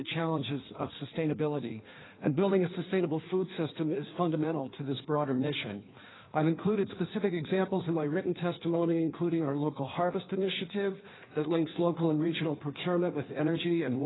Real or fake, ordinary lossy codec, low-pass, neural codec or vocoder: fake; AAC, 16 kbps; 7.2 kHz; codec, 16 kHz in and 24 kHz out, 1.1 kbps, FireRedTTS-2 codec